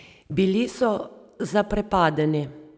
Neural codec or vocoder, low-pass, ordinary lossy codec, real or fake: none; none; none; real